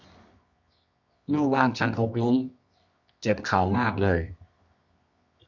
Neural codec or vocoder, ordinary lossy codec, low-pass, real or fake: codec, 24 kHz, 0.9 kbps, WavTokenizer, medium music audio release; none; 7.2 kHz; fake